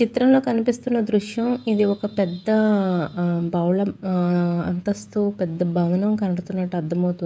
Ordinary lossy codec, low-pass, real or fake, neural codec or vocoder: none; none; fake; codec, 16 kHz, 16 kbps, FreqCodec, smaller model